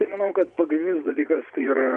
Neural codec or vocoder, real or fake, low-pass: vocoder, 22.05 kHz, 80 mel bands, Vocos; fake; 9.9 kHz